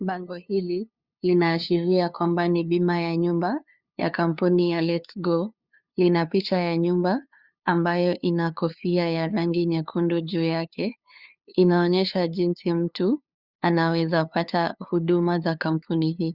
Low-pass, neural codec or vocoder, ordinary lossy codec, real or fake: 5.4 kHz; codec, 16 kHz, 2 kbps, FunCodec, trained on LibriTTS, 25 frames a second; Opus, 64 kbps; fake